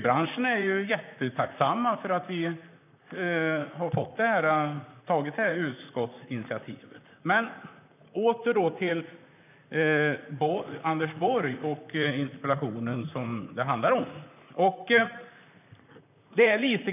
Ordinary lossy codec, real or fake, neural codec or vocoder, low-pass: none; fake; vocoder, 44.1 kHz, 128 mel bands, Pupu-Vocoder; 3.6 kHz